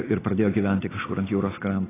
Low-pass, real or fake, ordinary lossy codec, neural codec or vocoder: 3.6 kHz; real; AAC, 16 kbps; none